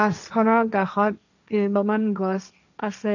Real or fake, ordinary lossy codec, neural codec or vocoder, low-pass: fake; none; codec, 16 kHz, 1.1 kbps, Voila-Tokenizer; 7.2 kHz